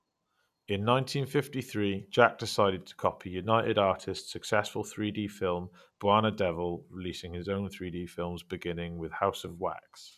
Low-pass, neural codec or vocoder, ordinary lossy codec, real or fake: 14.4 kHz; none; none; real